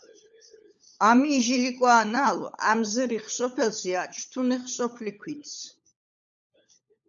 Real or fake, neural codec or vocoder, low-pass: fake; codec, 16 kHz, 16 kbps, FunCodec, trained on LibriTTS, 50 frames a second; 7.2 kHz